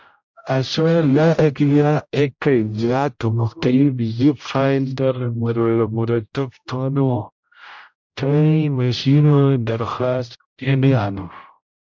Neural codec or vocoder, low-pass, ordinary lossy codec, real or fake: codec, 16 kHz, 0.5 kbps, X-Codec, HuBERT features, trained on general audio; 7.2 kHz; MP3, 64 kbps; fake